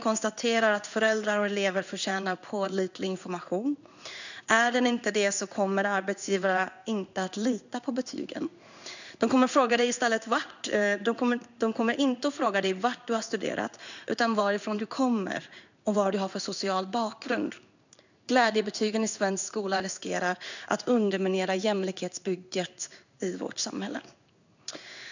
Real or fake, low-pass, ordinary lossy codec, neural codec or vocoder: fake; 7.2 kHz; none; codec, 16 kHz in and 24 kHz out, 1 kbps, XY-Tokenizer